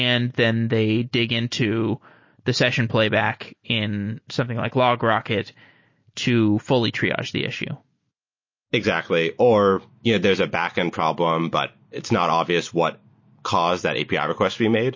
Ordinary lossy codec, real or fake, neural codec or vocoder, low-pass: MP3, 32 kbps; real; none; 7.2 kHz